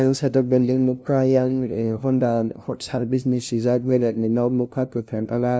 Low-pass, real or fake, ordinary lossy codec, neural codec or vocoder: none; fake; none; codec, 16 kHz, 0.5 kbps, FunCodec, trained on LibriTTS, 25 frames a second